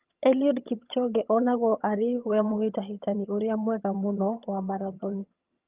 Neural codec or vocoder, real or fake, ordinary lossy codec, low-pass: vocoder, 22.05 kHz, 80 mel bands, HiFi-GAN; fake; Opus, 24 kbps; 3.6 kHz